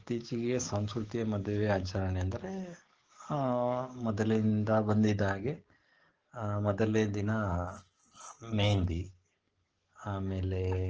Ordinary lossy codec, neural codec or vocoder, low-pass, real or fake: Opus, 16 kbps; codec, 44.1 kHz, 7.8 kbps, DAC; 7.2 kHz; fake